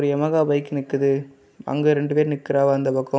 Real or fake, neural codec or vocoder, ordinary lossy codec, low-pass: real; none; none; none